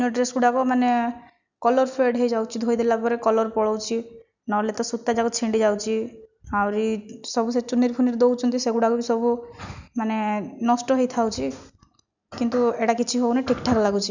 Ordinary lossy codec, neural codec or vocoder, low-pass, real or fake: none; none; 7.2 kHz; real